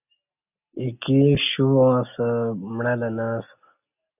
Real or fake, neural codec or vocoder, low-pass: real; none; 3.6 kHz